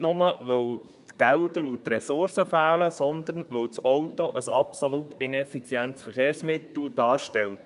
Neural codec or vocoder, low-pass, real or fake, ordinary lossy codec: codec, 24 kHz, 1 kbps, SNAC; 10.8 kHz; fake; none